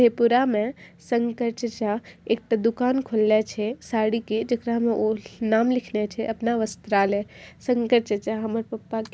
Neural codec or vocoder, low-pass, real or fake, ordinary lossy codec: none; none; real; none